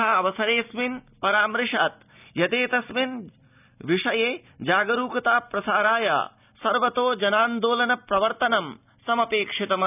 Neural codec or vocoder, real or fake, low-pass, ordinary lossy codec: none; real; 3.6 kHz; none